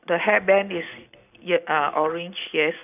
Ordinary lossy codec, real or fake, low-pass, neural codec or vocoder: none; real; 3.6 kHz; none